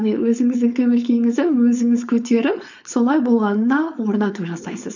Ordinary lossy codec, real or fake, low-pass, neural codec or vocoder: none; fake; 7.2 kHz; codec, 16 kHz, 4.8 kbps, FACodec